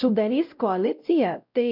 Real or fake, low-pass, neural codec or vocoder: fake; 5.4 kHz; codec, 16 kHz, 0.5 kbps, X-Codec, WavLM features, trained on Multilingual LibriSpeech